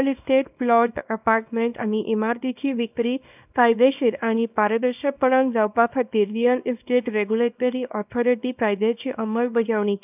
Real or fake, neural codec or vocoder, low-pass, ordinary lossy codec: fake; codec, 24 kHz, 0.9 kbps, WavTokenizer, small release; 3.6 kHz; none